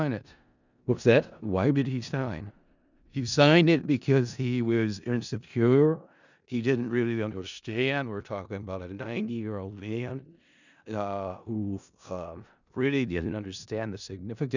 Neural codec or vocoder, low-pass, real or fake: codec, 16 kHz in and 24 kHz out, 0.4 kbps, LongCat-Audio-Codec, four codebook decoder; 7.2 kHz; fake